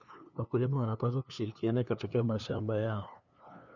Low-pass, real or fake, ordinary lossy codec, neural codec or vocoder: 7.2 kHz; fake; none; codec, 16 kHz, 2 kbps, FunCodec, trained on LibriTTS, 25 frames a second